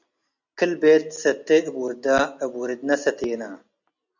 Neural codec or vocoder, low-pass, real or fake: none; 7.2 kHz; real